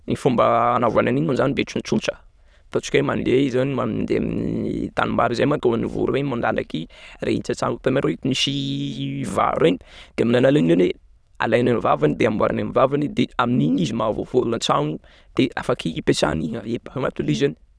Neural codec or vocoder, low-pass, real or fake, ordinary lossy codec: autoencoder, 22.05 kHz, a latent of 192 numbers a frame, VITS, trained on many speakers; none; fake; none